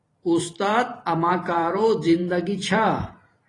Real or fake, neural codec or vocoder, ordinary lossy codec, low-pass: fake; vocoder, 44.1 kHz, 128 mel bands every 512 samples, BigVGAN v2; AAC, 32 kbps; 10.8 kHz